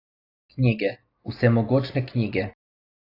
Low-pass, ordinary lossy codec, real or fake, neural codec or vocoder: 5.4 kHz; AAC, 32 kbps; real; none